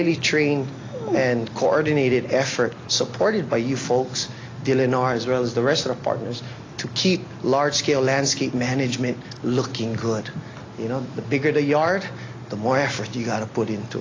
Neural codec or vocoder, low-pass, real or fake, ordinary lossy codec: none; 7.2 kHz; real; AAC, 32 kbps